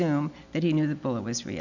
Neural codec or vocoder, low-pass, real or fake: none; 7.2 kHz; real